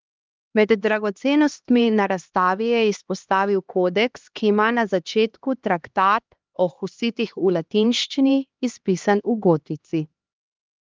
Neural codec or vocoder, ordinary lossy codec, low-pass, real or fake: codec, 16 kHz, 2 kbps, X-Codec, HuBERT features, trained on LibriSpeech; Opus, 32 kbps; 7.2 kHz; fake